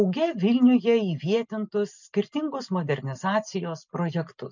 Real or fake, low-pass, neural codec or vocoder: real; 7.2 kHz; none